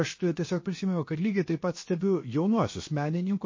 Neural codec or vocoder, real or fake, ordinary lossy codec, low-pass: codec, 16 kHz, about 1 kbps, DyCAST, with the encoder's durations; fake; MP3, 32 kbps; 7.2 kHz